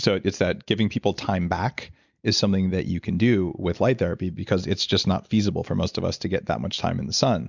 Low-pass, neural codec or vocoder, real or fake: 7.2 kHz; none; real